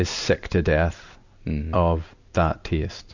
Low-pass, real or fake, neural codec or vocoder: 7.2 kHz; real; none